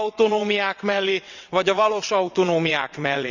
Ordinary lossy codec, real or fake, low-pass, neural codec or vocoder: none; fake; 7.2 kHz; vocoder, 22.05 kHz, 80 mel bands, WaveNeXt